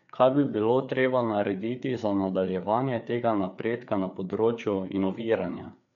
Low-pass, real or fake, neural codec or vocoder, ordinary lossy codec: 7.2 kHz; fake; codec, 16 kHz, 4 kbps, FreqCodec, larger model; none